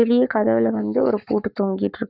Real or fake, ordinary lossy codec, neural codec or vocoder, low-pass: fake; Opus, 64 kbps; codec, 44.1 kHz, 7.8 kbps, Pupu-Codec; 5.4 kHz